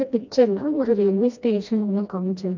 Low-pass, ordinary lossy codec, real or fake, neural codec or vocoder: 7.2 kHz; none; fake; codec, 16 kHz, 1 kbps, FreqCodec, smaller model